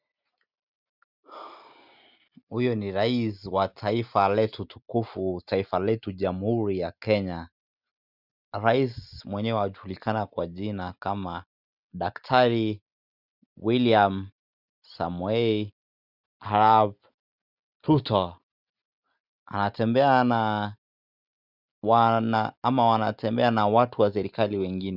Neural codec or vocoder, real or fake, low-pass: none; real; 5.4 kHz